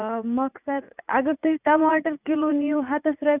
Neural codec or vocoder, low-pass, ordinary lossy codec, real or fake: vocoder, 44.1 kHz, 80 mel bands, Vocos; 3.6 kHz; none; fake